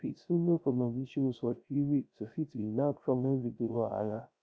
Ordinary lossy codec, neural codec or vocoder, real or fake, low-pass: none; codec, 16 kHz, 0.3 kbps, FocalCodec; fake; none